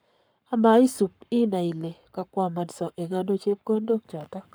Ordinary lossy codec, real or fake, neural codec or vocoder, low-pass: none; fake; codec, 44.1 kHz, 7.8 kbps, Pupu-Codec; none